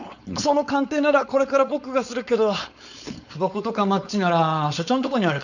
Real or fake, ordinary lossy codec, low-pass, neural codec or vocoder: fake; none; 7.2 kHz; codec, 16 kHz, 4.8 kbps, FACodec